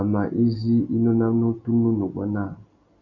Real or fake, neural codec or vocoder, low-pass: real; none; 7.2 kHz